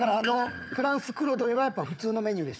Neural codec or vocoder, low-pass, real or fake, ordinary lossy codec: codec, 16 kHz, 4 kbps, FunCodec, trained on Chinese and English, 50 frames a second; none; fake; none